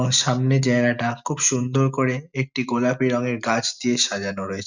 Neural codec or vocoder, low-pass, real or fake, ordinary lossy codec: none; 7.2 kHz; real; none